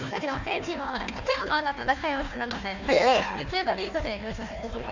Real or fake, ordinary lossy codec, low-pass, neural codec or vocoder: fake; none; 7.2 kHz; codec, 16 kHz, 1 kbps, FunCodec, trained on Chinese and English, 50 frames a second